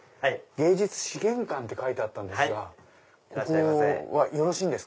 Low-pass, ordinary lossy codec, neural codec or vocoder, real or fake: none; none; none; real